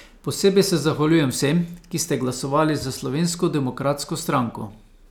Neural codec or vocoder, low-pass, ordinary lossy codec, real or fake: none; none; none; real